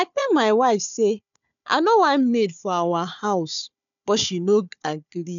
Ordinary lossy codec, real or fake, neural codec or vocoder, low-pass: none; fake; codec, 16 kHz, 4 kbps, FunCodec, trained on Chinese and English, 50 frames a second; 7.2 kHz